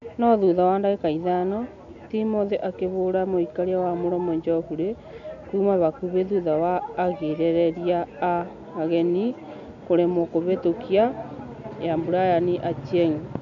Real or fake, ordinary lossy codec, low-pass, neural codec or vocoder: real; AAC, 64 kbps; 7.2 kHz; none